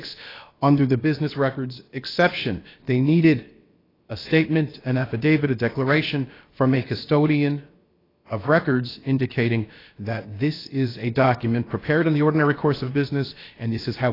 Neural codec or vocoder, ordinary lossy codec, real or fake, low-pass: codec, 16 kHz, about 1 kbps, DyCAST, with the encoder's durations; AAC, 24 kbps; fake; 5.4 kHz